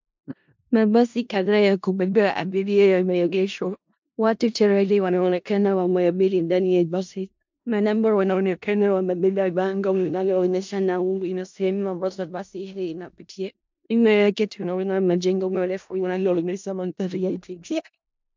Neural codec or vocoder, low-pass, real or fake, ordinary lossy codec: codec, 16 kHz in and 24 kHz out, 0.4 kbps, LongCat-Audio-Codec, four codebook decoder; 7.2 kHz; fake; MP3, 64 kbps